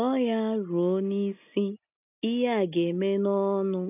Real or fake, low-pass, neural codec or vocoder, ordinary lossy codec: real; 3.6 kHz; none; none